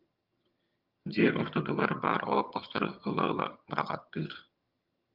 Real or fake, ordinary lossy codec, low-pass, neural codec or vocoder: fake; Opus, 32 kbps; 5.4 kHz; vocoder, 22.05 kHz, 80 mel bands, HiFi-GAN